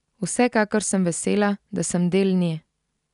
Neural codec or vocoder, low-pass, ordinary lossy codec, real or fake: none; 10.8 kHz; none; real